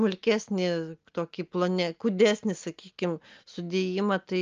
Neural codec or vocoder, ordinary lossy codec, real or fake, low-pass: none; Opus, 24 kbps; real; 7.2 kHz